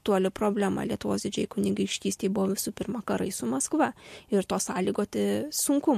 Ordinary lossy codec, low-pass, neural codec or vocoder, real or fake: MP3, 64 kbps; 14.4 kHz; none; real